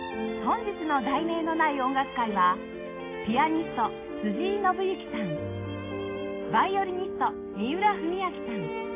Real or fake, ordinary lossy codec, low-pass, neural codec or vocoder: real; AAC, 24 kbps; 3.6 kHz; none